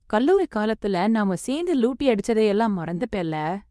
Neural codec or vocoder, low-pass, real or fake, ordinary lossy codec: codec, 24 kHz, 0.9 kbps, WavTokenizer, medium speech release version 2; none; fake; none